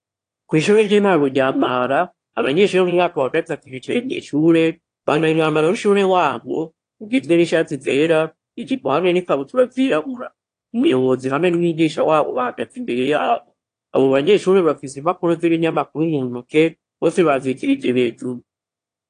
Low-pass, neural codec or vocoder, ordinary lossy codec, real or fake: 9.9 kHz; autoencoder, 22.05 kHz, a latent of 192 numbers a frame, VITS, trained on one speaker; AAC, 48 kbps; fake